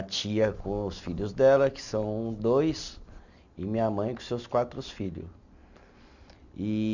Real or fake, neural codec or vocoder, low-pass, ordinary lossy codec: real; none; 7.2 kHz; none